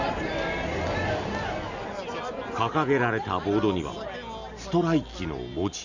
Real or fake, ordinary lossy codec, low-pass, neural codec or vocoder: real; none; 7.2 kHz; none